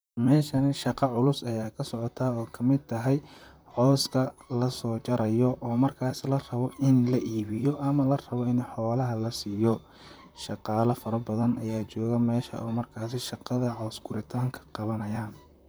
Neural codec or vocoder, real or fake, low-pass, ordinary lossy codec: vocoder, 44.1 kHz, 128 mel bands, Pupu-Vocoder; fake; none; none